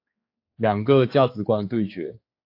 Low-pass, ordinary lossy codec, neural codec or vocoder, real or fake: 5.4 kHz; AAC, 32 kbps; codec, 16 kHz, 4 kbps, X-Codec, HuBERT features, trained on general audio; fake